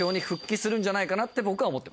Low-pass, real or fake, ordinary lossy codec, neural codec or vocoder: none; real; none; none